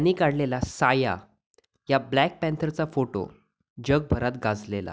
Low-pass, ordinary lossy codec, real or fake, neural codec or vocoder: none; none; real; none